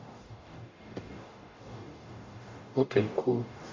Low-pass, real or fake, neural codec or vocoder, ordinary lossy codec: 7.2 kHz; fake; codec, 44.1 kHz, 0.9 kbps, DAC; MP3, 48 kbps